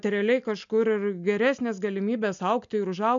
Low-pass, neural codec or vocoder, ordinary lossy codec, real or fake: 7.2 kHz; none; MP3, 64 kbps; real